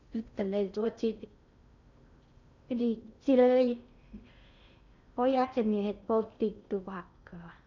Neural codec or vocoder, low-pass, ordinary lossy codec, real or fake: codec, 16 kHz in and 24 kHz out, 0.6 kbps, FocalCodec, streaming, 4096 codes; 7.2 kHz; none; fake